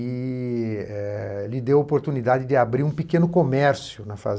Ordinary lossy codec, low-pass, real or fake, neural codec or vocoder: none; none; real; none